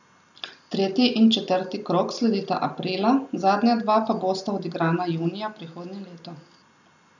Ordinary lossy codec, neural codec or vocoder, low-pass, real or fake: none; none; 7.2 kHz; real